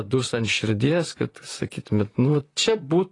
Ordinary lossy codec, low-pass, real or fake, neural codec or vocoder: AAC, 32 kbps; 10.8 kHz; fake; vocoder, 44.1 kHz, 128 mel bands, Pupu-Vocoder